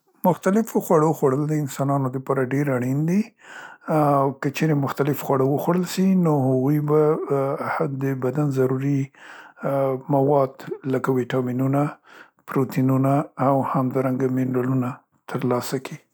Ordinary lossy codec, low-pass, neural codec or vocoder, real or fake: none; none; none; real